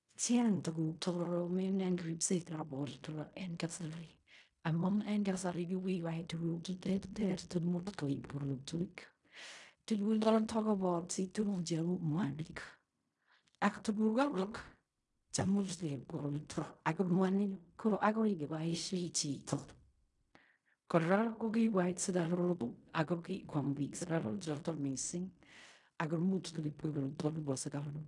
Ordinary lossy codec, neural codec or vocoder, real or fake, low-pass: none; codec, 16 kHz in and 24 kHz out, 0.4 kbps, LongCat-Audio-Codec, fine tuned four codebook decoder; fake; 10.8 kHz